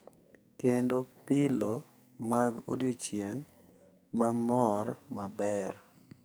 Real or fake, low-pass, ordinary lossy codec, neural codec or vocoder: fake; none; none; codec, 44.1 kHz, 2.6 kbps, SNAC